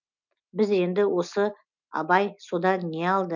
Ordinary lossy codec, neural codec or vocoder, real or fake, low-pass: none; none; real; 7.2 kHz